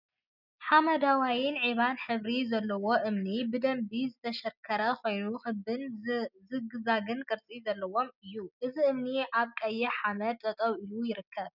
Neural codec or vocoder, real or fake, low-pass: none; real; 5.4 kHz